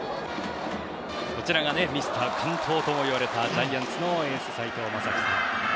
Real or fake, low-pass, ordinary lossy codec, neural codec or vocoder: real; none; none; none